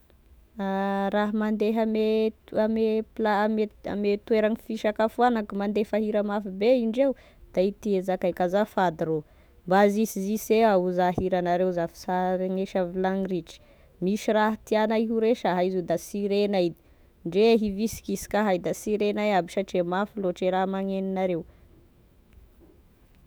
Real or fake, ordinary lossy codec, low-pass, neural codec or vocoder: fake; none; none; autoencoder, 48 kHz, 128 numbers a frame, DAC-VAE, trained on Japanese speech